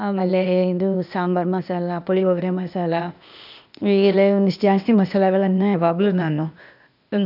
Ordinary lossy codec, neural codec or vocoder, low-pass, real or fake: none; codec, 16 kHz, 0.8 kbps, ZipCodec; 5.4 kHz; fake